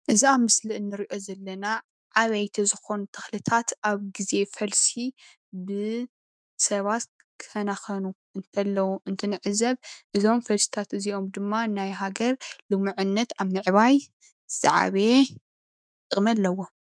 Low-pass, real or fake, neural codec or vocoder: 9.9 kHz; fake; autoencoder, 48 kHz, 128 numbers a frame, DAC-VAE, trained on Japanese speech